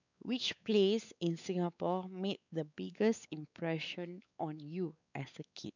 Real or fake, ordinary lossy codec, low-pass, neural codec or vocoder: fake; none; 7.2 kHz; codec, 16 kHz, 4 kbps, X-Codec, WavLM features, trained on Multilingual LibriSpeech